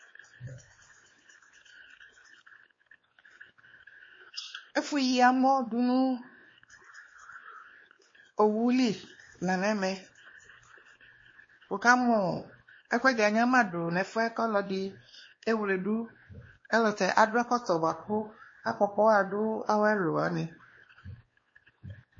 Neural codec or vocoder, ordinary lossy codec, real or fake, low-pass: codec, 16 kHz, 2 kbps, X-Codec, WavLM features, trained on Multilingual LibriSpeech; MP3, 32 kbps; fake; 7.2 kHz